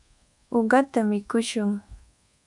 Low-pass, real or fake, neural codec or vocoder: 10.8 kHz; fake; codec, 24 kHz, 1.2 kbps, DualCodec